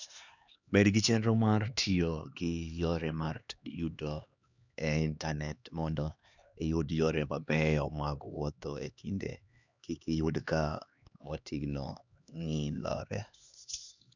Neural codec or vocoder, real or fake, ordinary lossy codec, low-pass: codec, 16 kHz, 2 kbps, X-Codec, HuBERT features, trained on LibriSpeech; fake; none; 7.2 kHz